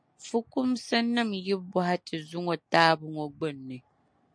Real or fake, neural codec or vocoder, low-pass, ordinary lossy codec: real; none; 9.9 kHz; MP3, 64 kbps